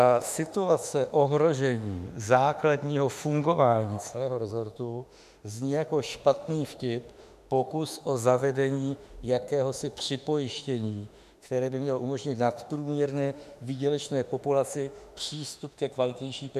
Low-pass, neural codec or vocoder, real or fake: 14.4 kHz; autoencoder, 48 kHz, 32 numbers a frame, DAC-VAE, trained on Japanese speech; fake